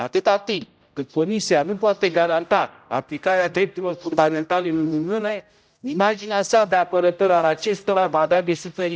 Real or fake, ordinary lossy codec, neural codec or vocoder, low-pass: fake; none; codec, 16 kHz, 0.5 kbps, X-Codec, HuBERT features, trained on general audio; none